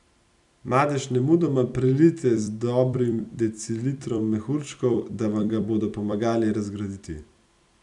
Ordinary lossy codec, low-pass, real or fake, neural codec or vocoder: none; 10.8 kHz; fake; vocoder, 44.1 kHz, 128 mel bands every 256 samples, BigVGAN v2